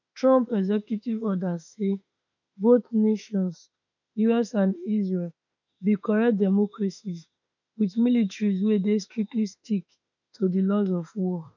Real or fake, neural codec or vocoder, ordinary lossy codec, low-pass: fake; autoencoder, 48 kHz, 32 numbers a frame, DAC-VAE, trained on Japanese speech; none; 7.2 kHz